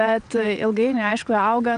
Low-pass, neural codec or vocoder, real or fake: 9.9 kHz; vocoder, 22.05 kHz, 80 mel bands, WaveNeXt; fake